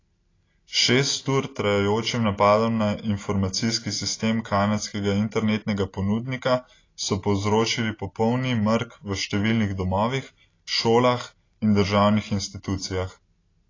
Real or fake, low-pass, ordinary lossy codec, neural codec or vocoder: real; 7.2 kHz; AAC, 32 kbps; none